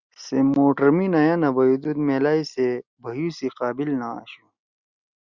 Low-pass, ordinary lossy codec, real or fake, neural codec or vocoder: 7.2 kHz; Opus, 64 kbps; real; none